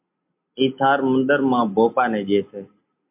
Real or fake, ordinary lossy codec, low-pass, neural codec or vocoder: real; MP3, 32 kbps; 3.6 kHz; none